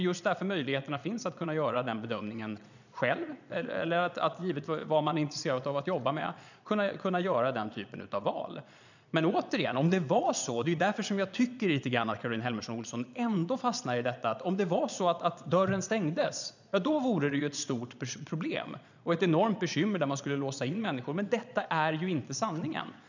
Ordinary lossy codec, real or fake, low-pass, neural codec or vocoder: none; fake; 7.2 kHz; vocoder, 22.05 kHz, 80 mel bands, Vocos